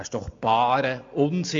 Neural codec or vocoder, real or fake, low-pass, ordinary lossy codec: none; real; 7.2 kHz; MP3, 48 kbps